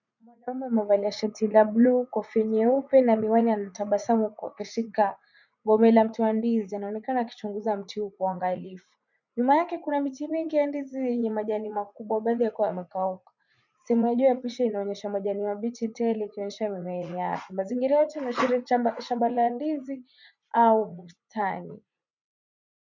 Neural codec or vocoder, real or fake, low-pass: vocoder, 44.1 kHz, 80 mel bands, Vocos; fake; 7.2 kHz